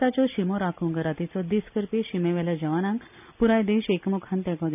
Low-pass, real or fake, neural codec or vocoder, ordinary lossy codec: 3.6 kHz; real; none; none